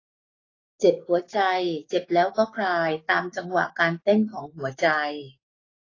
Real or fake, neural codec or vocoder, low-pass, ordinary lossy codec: fake; codec, 16 kHz, 6 kbps, DAC; 7.2 kHz; AAC, 32 kbps